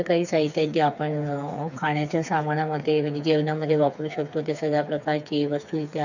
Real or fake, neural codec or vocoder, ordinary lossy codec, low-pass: fake; codec, 16 kHz, 4 kbps, FreqCodec, smaller model; none; 7.2 kHz